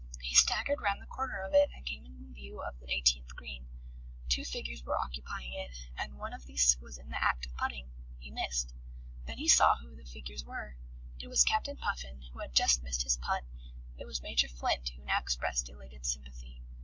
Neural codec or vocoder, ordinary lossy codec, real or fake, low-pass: none; MP3, 64 kbps; real; 7.2 kHz